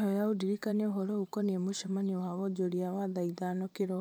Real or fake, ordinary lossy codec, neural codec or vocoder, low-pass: fake; none; vocoder, 44.1 kHz, 128 mel bands every 512 samples, BigVGAN v2; none